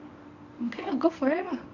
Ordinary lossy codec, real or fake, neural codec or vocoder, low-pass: none; fake; codec, 24 kHz, 0.9 kbps, WavTokenizer, medium speech release version 1; 7.2 kHz